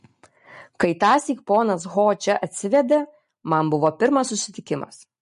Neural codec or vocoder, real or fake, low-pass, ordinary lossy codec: none; real; 14.4 kHz; MP3, 48 kbps